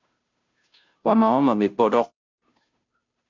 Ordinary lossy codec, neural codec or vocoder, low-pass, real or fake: MP3, 64 kbps; codec, 16 kHz, 0.5 kbps, FunCodec, trained on Chinese and English, 25 frames a second; 7.2 kHz; fake